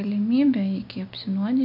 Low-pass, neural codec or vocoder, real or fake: 5.4 kHz; none; real